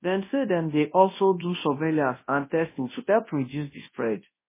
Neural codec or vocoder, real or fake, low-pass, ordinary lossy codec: codec, 24 kHz, 0.9 kbps, WavTokenizer, large speech release; fake; 3.6 kHz; MP3, 16 kbps